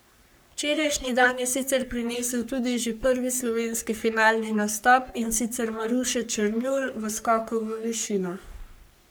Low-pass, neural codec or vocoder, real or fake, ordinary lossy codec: none; codec, 44.1 kHz, 3.4 kbps, Pupu-Codec; fake; none